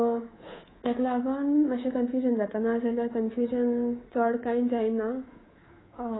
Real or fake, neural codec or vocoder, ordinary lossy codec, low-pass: fake; codec, 24 kHz, 3.1 kbps, DualCodec; AAC, 16 kbps; 7.2 kHz